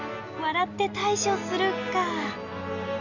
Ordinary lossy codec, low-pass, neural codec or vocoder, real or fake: Opus, 64 kbps; 7.2 kHz; none; real